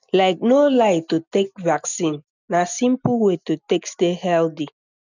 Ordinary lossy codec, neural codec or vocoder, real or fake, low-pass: none; none; real; 7.2 kHz